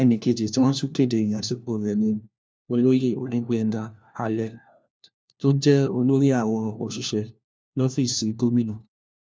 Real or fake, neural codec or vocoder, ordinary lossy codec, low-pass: fake; codec, 16 kHz, 1 kbps, FunCodec, trained on LibriTTS, 50 frames a second; none; none